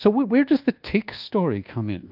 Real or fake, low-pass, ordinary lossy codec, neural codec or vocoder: fake; 5.4 kHz; Opus, 24 kbps; codec, 24 kHz, 1.2 kbps, DualCodec